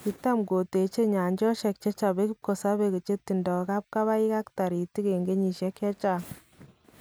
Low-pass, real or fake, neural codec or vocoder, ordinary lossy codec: none; real; none; none